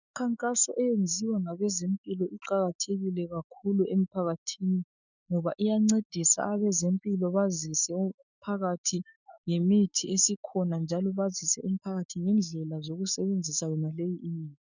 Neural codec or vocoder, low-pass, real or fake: autoencoder, 48 kHz, 128 numbers a frame, DAC-VAE, trained on Japanese speech; 7.2 kHz; fake